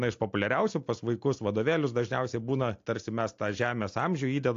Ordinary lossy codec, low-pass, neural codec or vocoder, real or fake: AAC, 48 kbps; 7.2 kHz; none; real